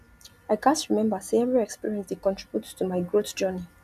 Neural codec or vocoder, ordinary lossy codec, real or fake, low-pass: none; none; real; 14.4 kHz